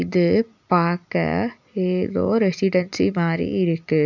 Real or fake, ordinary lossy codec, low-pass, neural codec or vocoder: real; none; 7.2 kHz; none